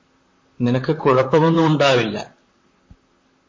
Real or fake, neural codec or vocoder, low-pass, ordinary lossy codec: fake; vocoder, 44.1 kHz, 128 mel bands, Pupu-Vocoder; 7.2 kHz; MP3, 32 kbps